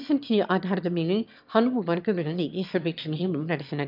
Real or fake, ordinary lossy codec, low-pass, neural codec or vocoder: fake; none; 5.4 kHz; autoencoder, 22.05 kHz, a latent of 192 numbers a frame, VITS, trained on one speaker